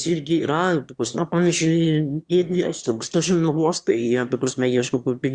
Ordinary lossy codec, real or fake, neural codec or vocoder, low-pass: Opus, 64 kbps; fake; autoencoder, 22.05 kHz, a latent of 192 numbers a frame, VITS, trained on one speaker; 9.9 kHz